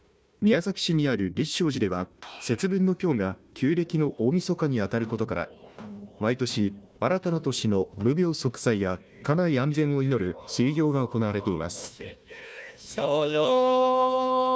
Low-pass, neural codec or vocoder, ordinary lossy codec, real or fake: none; codec, 16 kHz, 1 kbps, FunCodec, trained on Chinese and English, 50 frames a second; none; fake